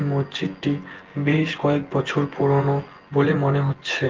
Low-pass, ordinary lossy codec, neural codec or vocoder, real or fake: 7.2 kHz; Opus, 16 kbps; vocoder, 24 kHz, 100 mel bands, Vocos; fake